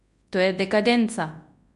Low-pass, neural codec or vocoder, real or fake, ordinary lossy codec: 10.8 kHz; codec, 24 kHz, 0.9 kbps, WavTokenizer, large speech release; fake; MP3, 64 kbps